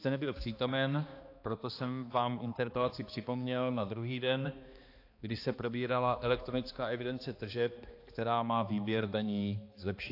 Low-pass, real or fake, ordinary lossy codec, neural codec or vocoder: 5.4 kHz; fake; AAC, 32 kbps; codec, 16 kHz, 2 kbps, X-Codec, HuBERT features, trained on balanced general audio